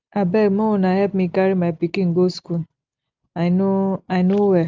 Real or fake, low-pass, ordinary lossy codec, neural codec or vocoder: real; 7.2 kHz; Opus, 32 kbps; none